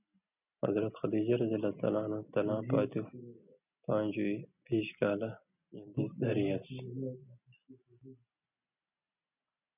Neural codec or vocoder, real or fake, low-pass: none; real; 3.6 kHz